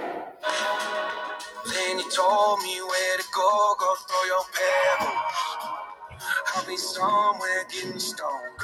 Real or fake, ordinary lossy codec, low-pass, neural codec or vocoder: real; MP3, 96 kbps; 19.8 kHz; none